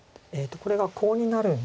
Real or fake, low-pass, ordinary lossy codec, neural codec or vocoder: real; none; none; none